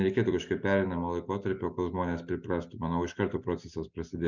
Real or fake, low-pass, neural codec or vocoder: real; 7.2 kHz; none